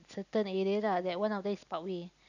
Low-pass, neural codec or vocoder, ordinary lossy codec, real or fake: 7.2 kHz; none; none; real